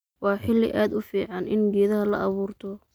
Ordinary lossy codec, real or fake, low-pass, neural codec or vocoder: none; real; none; none